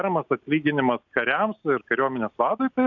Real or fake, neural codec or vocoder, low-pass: real; none; 7.2 kHz